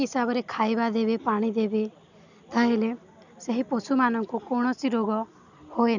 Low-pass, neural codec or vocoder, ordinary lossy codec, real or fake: 7.2 kHz; none; none; real